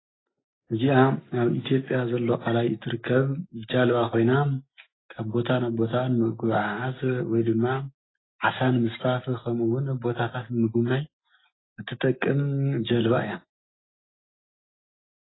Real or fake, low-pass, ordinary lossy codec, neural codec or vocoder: real; 7.2 kHz; AAC, 16 kbps; none